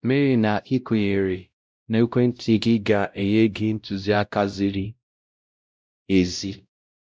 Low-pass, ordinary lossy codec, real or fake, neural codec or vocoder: none; none; fake; codec, 16 kHz, 0.5 kbps, X-Codec, WavLM features, trained on Multilingual LibriSpeech